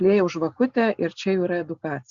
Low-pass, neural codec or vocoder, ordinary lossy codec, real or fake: 7.2 kHz; none; Opus, 64 kbps; real